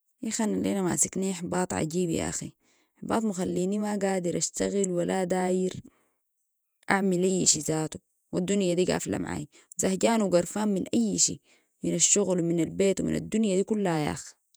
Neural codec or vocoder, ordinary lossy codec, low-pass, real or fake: vocoder, 48 kHz, 128 mel bands, Vocos; none; none; fake